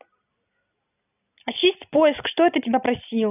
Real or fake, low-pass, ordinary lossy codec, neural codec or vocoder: real; 3.6 kHz; none; none